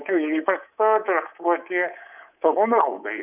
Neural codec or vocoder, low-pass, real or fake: codec, 24 kHz, 3.1 kbps, DualCodec; 3.6 kHz; fake